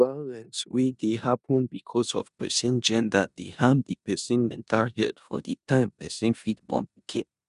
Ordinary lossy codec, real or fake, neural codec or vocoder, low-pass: none; fake; codec, 16 kHz in and 24 kHz out, 0.9 kbps, LongCat-Audio-Codec, four codebook decoder; 10.8 kHz